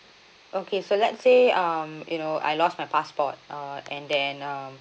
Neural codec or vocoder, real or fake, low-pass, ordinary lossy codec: none; real; none; none